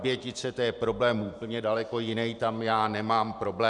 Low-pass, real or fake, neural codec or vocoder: 10.8 kHz; fake; vocoder, 44.1 kHz, 128 mel bands every 512 samples, BigVGAN v2